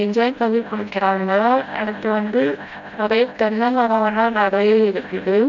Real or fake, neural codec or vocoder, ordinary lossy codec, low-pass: fake; codec, 16 kHz, 0.5 kbps, FreqCodec, smaller model; none; 7.2 kHz